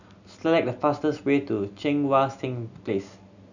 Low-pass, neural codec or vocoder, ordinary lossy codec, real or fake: 7.2 kHz; none; none; real